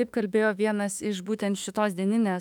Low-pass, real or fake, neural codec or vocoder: 19.8 kHz; fake; autoencoder, 48 kHz, 32 numbers a frame, DAC-VAE, trained on Japanese speech